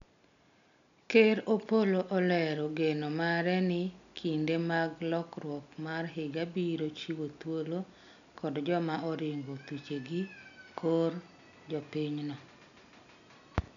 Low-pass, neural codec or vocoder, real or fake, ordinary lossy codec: 7.2 kHz; none; real; none